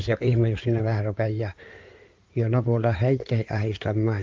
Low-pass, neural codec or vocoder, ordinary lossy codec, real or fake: 7.2 kHz; codec, 16 kHz in and 24 kHz out, 2.2 kbps, FireRedTTS-2 codec; Opus, 32 kbps; fake